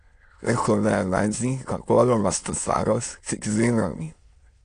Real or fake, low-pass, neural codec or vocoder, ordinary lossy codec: fake; 9.9 kHz; autoencoder, 22.05 kHz, a latent of 192 numbers a frame, VITS, trained on many speakers; AAC, 48 kbps